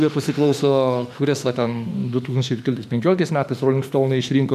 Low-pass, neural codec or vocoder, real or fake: 14.4 kHz; autoencoder, 48 kHz, 32 numbers a frame, DAC-VAE, trained on Japanese speech; fake